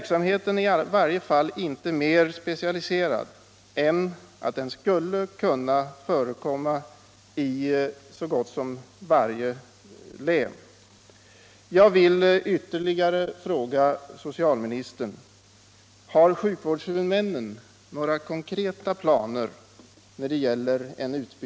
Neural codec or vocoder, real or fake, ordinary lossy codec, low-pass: none; real; none; none